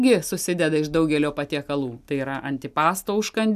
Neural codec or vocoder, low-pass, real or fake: none; 14.4 kHz; real